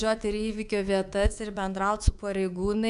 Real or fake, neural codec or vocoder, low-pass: fake; codec, 24 kHz, 3.1 kbps, DualCodec; 10.8 kHz